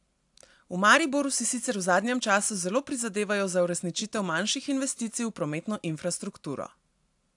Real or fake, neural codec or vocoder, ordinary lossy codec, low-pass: real; none; AAC, 64 kbps; 10.8 kHz